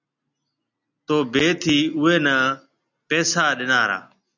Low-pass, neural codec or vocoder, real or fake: 7.2 kHz; none; real